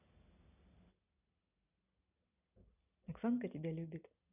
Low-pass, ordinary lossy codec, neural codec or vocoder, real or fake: 3.6 kHz; none; none; real